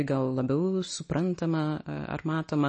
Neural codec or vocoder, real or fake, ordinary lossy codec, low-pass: none; real; MP3, 32 kbps; 10.8 kHz